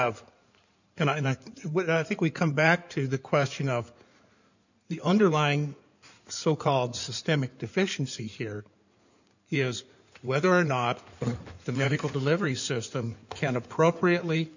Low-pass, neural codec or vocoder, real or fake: 7.2 kHz; codec, 16 kHz in and 24 kHz out, 2.2 kbps, FireRedTTS-2 codec; fake